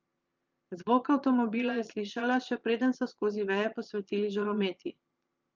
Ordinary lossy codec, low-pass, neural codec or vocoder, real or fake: Opus, 24 kbps; 7.2 kHz; vocoder, 22.05 kHz, 80 mel bands, Vocos; fake